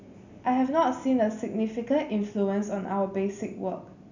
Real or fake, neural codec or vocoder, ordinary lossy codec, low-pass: real; none; none; 7.2 kHz